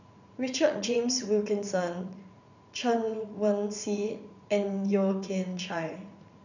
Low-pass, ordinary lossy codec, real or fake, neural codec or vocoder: 7.2 kHz; none; fake; vocoder, 44.1 kHz, 80 mel bands, Vocos